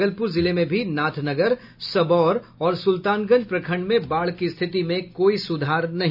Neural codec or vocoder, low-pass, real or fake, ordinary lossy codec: none; 5.4 kHz; real; none